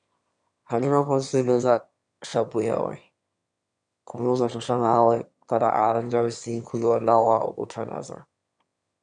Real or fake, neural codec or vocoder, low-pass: fake; autoencoder, 22.05 kHz, a latent of 192 numbers a frame, VITS, trained on one speaker; 9.9 kHz